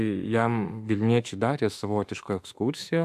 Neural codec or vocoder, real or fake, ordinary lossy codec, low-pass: autoencoder, 48 kHz, 32 numbers a frame, DAC-VAE, trained on Japanese speech; fake; AAC, 96 kbps; 14.4 kHz